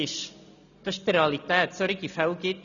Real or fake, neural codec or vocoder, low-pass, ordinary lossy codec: real; none; 7.2 kHz; none